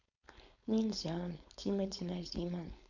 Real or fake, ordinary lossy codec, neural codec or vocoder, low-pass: fake; none; codec, 16 kHz, 4.8 kbps, FACodec; 7.2 kHz